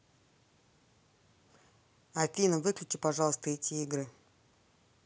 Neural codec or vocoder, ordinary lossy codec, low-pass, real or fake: none; none; none; real